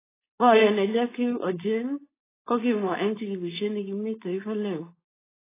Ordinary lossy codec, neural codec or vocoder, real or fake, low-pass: AAC, 16 kbps; codec, 16 kHz, 4.8 kbps, FACodec; fake; 3.6 kHz